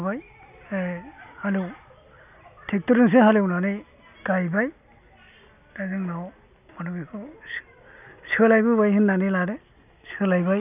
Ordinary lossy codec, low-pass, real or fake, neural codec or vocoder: none; 3.6 kHz; real; none